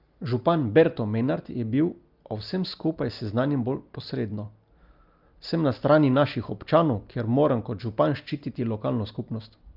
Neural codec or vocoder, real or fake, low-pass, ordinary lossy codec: none; real; 5.4 kHz; Opus, 24 kbps